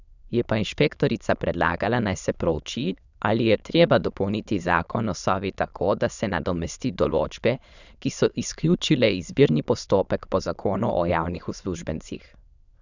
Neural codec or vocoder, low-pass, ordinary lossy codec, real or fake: autoencoder, 22.05 kHz, a latent of 192 numbers a frame, VITS, trained on many speakers; 7.2 kHz; none; fake